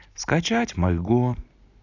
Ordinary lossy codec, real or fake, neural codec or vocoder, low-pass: AAC, 48 kbps; real; none; 7.2 kHz